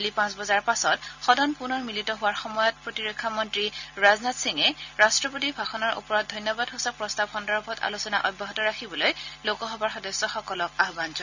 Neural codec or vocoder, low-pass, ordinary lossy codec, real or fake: none; 7.2 kHz; none; real